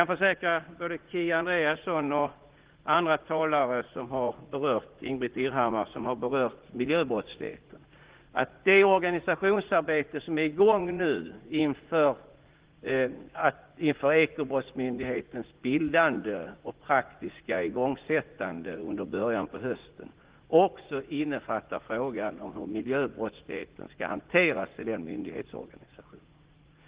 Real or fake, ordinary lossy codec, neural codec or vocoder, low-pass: fake; Opus, 16 kbps; vocoder, 44.1 kHz, 80 mel bands, Vocos; 3.6 kHz